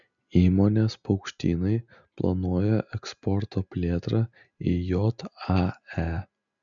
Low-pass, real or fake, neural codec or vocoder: 7.2 kHz; real; none